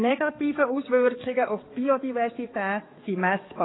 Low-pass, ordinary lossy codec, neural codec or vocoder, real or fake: 7.2 kHz; AAC, 16 kbps; codec, 16 kHz, 4 kbps, X-Codec, HuBERT features, trained on balanced general audio; fake